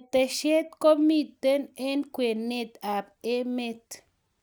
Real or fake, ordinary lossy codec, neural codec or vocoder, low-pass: real; none; none; none